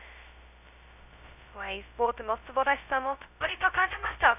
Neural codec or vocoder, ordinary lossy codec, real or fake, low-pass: codec, 16 kHz, 0.2 kbps, FocalCodec; none; fake; 3.6 kHz